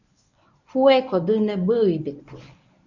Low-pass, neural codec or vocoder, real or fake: 7.2 kHz; codec, 24 kHz, 0.9 kbps, WavTokenizer, medium speech release version 1; fake